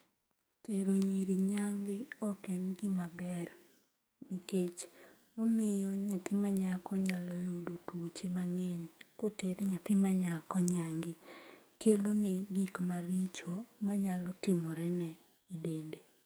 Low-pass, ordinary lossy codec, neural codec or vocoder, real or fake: none; none; codec, 44.1 kHz, 2.6 kbps, SNAC; fake